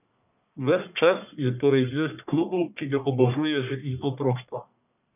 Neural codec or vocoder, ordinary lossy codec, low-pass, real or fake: codec, 44.1 kHz, 1.7 kbps, Pupu-Codec; none; 3.6 kHz; fake